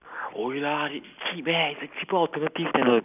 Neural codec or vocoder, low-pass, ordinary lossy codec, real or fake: none; 3.6 kHz; none; real